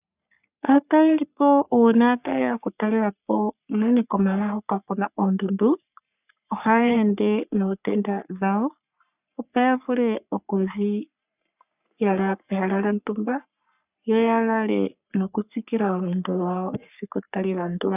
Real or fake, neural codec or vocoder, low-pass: fake; codec, 44.1 kHz, 3.4 kbps, Pupu-Codec; 3.6 kHz